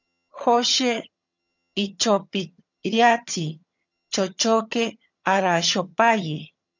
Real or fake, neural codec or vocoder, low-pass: fake; vocoder, 22.05 kHz, 80 mel bands, HiFi-GAN; 7.2 kHz